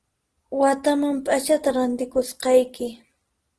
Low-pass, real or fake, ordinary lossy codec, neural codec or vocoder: 10.8 kHz; real; Opus, 16 kbps; none